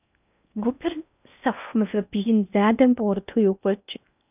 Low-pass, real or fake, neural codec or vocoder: 3.6 kHz; fake; codec, 16 kHz in and 24 kHz out, 0.6 kbps, FocalCodec, streaming, 4096 codes